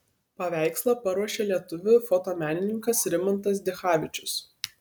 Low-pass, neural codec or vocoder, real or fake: 19.8 kHz; none; real